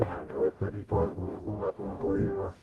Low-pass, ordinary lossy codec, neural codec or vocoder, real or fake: 19.8 kHz; Opus, 24 kbps; codec, 44.1 kHz, 0.9 kbps, DAC; fake